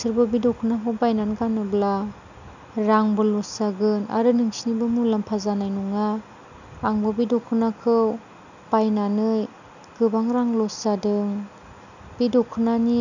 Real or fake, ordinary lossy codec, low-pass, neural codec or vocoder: real; none; 7.2 kHz; none